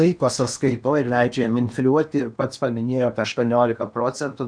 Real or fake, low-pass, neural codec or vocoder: fake; 9.9 kHz; codec, 16 kHz in and 24 kHz out, 0.8 kbps, FocalCodec, streaming, 65536 codes